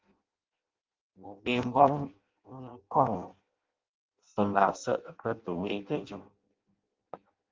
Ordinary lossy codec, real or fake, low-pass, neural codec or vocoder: Opus, 32 kbps; fake; 7.2 kHz; codec, 16 kHz in and 24 kHz out, 0.6 kbps, FireRedTTS-2 codec